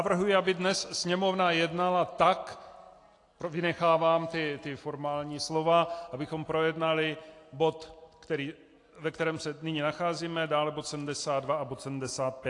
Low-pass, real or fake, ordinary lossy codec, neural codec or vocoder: 10.8 kHz; real; AAC, 48 kbps; none